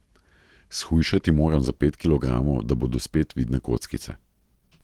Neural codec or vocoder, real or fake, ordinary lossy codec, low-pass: autoencoder, 48 kHz, 128 numbers a frame, DAC-VAE, trained on Japanese speech; fake; Opus, 16 kbps; 19.8 kHz